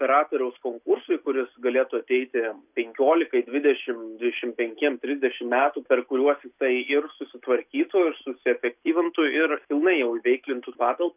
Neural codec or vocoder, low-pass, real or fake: none; 3.6 kHz; real